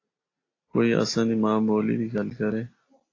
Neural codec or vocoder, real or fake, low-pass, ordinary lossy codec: none; real; 7.2 kHz; AAC, 32 kbps